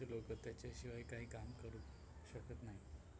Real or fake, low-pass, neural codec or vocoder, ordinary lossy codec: real; none; none; none